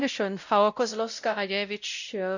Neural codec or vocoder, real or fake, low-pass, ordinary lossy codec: codec, 16 kHz, 0.5 kbps, X-Codec, WavLM features, trained on Multilingual LibriSpeech; fake; 7.2 kHz; none